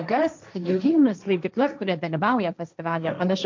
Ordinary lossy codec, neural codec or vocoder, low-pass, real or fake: MP3, 64 kbps; codec, 16 kHz, 1.1 kbps, Voila-Tokenizer; 7.2 kHz; fake